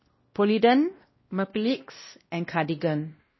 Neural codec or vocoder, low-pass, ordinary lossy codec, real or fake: codec, 16 kHz, 1 kbps, X-Codec, WavLM features, trained on Multilingual LibriSpeech; 7.2 kHz; MP3, 24 kbps; fake